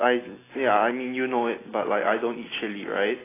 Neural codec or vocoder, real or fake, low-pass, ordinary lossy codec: none; real; 3.6 kHz; AAC, 16 kbps